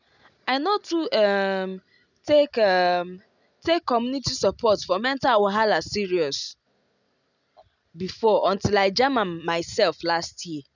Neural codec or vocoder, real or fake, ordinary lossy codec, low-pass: none; real; none; 7.2 kHz